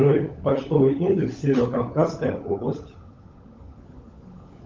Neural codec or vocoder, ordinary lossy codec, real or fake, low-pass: codec, 16 kHz, 16 kbps, FunCodec, trained on LibriTTS, 50 frames a second; Opus, 24 kbps; fake; 7.2 kHz